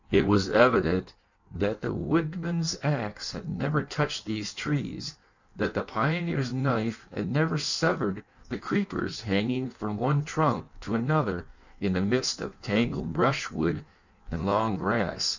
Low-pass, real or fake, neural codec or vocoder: 7.2 kHz; fake; codec, 16 kHz in and 24 kHz out, 1.1 kbps, FireRedTTS-2 codec